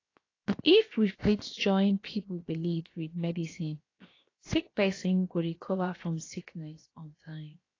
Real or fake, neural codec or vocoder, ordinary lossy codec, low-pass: fake; codec, 16 kHz, 0.7 kbps, FocalCodec; AAC, 32 kbps; 7.2 kHz